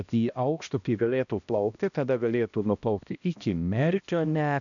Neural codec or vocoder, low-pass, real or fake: codec, 16 kHz, 1 kbps, X-Codec, HuBERT features, trained on balanced general audio; 7.2 kHz; fake